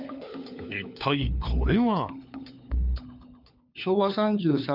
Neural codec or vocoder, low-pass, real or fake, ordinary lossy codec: codec, 16 kHz, 4 kbps, FunCodec, trained on LibriTTS, 50 frames a second; 5.4 kHz; fake; none